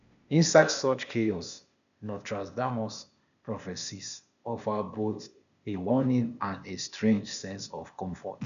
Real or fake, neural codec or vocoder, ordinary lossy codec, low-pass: fake; codec, 16 kHz, 0.8 kbps, ZipCodec; none; 7.2 kHz